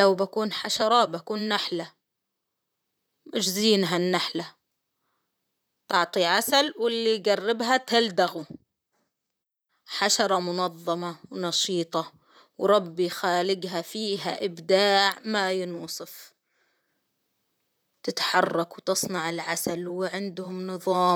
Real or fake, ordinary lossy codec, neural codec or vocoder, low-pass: fake; none; vocoder, 44.1 kHz, 128 mel bands, Pupu-Vocoder; none